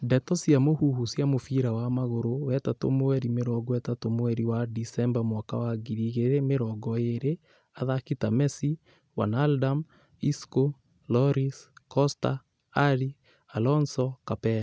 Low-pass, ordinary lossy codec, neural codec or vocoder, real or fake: none; none; none; real